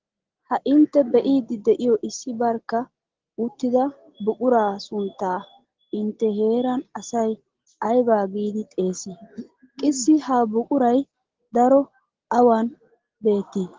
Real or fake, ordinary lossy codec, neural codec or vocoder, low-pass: real; Opus, 16 kbps; none; 7.2 kHz